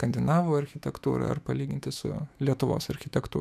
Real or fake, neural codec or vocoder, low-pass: fake; autoencoder, 48 kHz, 128 numbers a frame, DAC-VAE, trained on Japanese speech; 14.4 kHz